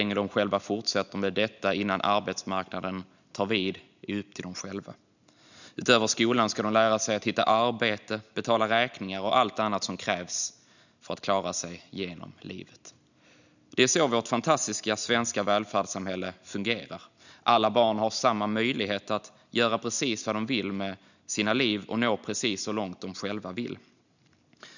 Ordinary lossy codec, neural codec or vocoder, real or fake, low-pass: none; none; real; 7.2 kHz